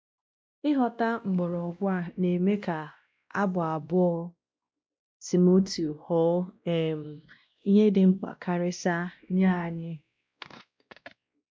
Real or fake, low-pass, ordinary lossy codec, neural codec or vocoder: fake; none; none; codec, 16 kHz, 1 kbps, X-Codec, WavLM features, trained on Multilingual LibriSpeech